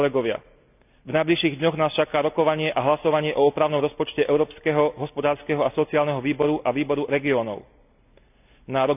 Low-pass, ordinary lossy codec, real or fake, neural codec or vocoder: 3.6 kHz; none; real; none